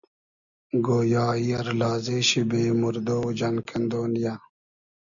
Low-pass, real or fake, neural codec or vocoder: 7.2 kHz; real; none